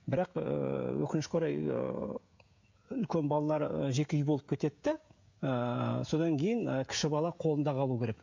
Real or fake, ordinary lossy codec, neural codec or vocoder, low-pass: fake; MP3, 48 kbps; codec, 16 kHz, 16 kbps, FreqCodec, smaller model; 7.2 kHz